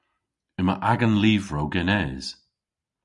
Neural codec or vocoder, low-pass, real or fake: none; 10.8 kHz; real